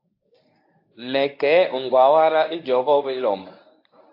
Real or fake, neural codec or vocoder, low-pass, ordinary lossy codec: fake; codec, 24 kHz, 0.9 kbps, WavTokenizer, medium speech release version 1; 5.4 kHz; AAC, 32 kbps